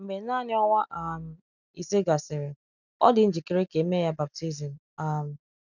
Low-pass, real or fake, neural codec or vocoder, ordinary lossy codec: 7.2 kHz; real; none; none